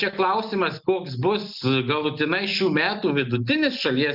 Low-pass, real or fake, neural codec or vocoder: 5.4 kHz; real; none